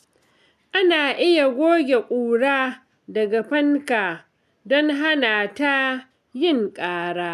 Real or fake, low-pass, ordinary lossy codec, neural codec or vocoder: real; 14.4 kHz; MP3, 96 kbps; none